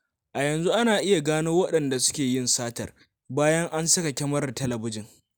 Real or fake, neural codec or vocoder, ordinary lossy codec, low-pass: real; none; none; none